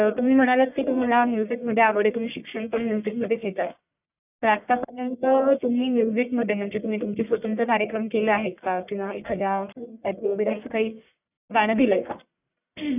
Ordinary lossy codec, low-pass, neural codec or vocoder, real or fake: none; 3.6 kHz; codec, 44.1 kHz, 1.7 kbps, Pupu-Codec; fake